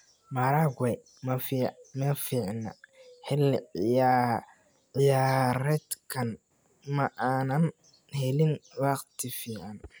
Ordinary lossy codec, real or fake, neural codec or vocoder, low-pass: none; real; none; none